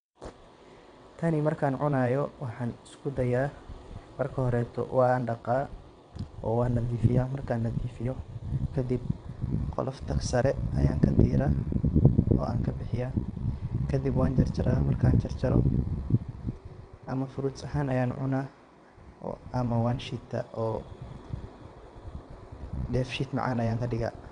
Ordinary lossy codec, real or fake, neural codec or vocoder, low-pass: none; fake; vocoder, 22.05 kHz, 80 mel bands, WaveNeXt; 9.9 kHz